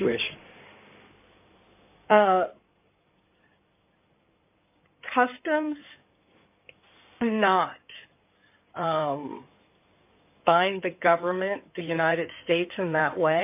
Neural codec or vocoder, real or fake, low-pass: codec, 16 kHz in and 24 kHz out, 2.2 kbps, FireRedTTS-2 codec; fake; 3.6 kHz